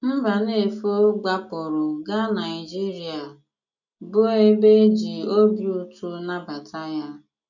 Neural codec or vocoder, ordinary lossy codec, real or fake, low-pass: none; none; real; 7.2 kHz